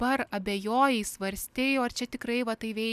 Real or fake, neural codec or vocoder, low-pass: real; none; 14.4 kHz